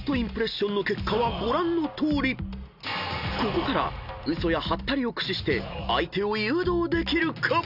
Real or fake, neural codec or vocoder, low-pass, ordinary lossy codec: real; none; 5.4 kHz; none